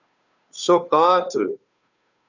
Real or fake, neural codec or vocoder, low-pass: fake; codec, 16 kHz, 2 kbps, FunCodec, trained on Chinese and English, 25 frames a second; 7.2 kHz